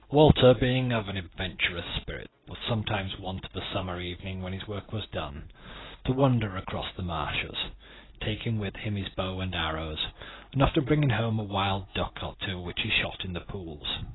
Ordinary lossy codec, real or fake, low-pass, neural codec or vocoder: AAC, 16 kbps; real; 7.2 kHz; none